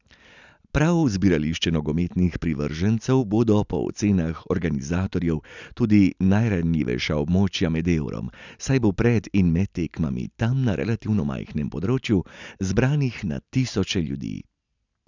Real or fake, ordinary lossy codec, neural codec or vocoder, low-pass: real; none; none; 7.2 kHz